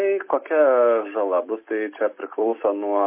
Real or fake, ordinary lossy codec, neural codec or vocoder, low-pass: real; MP3, 24 kbps; none; 3.6 kHz